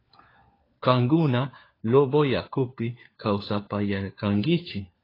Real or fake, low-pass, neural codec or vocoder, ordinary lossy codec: fake; 5.4 kHz; codec, 16 kHz, 4 kbps, FunCodec, trained on LibriTTS, 50 frames a second; AAC, 24 kbps